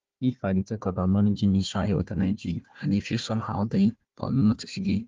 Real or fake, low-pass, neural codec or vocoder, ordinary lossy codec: fake; 7.2 kHz; codec, 16 kHz, 1 kbps, FunCodec, trained on Chinese and English, 50 frames a second; Opus, 32 kbps